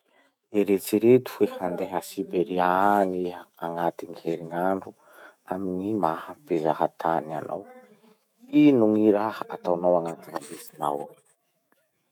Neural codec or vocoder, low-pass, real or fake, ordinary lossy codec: autoencoder, 48 kHz, 128 numbers a frame, DAC-VAE, trained on Japanese speech; 19.8 kHz; fake; none